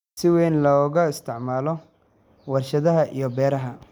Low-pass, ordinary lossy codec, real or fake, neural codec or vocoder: 19.8 kHz; none; real; none